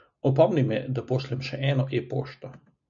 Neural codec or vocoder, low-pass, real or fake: none; 7.2 kHz; real